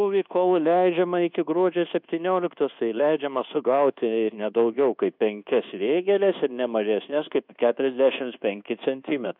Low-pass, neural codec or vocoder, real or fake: 5.4 kHz; codec, 24 kHz, 1.2 kbps, DualCodec; fake